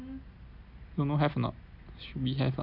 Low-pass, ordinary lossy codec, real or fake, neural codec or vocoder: 5.4 kHz; none; real; none